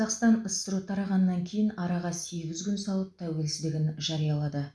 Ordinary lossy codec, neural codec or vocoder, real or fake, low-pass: none; none; real; none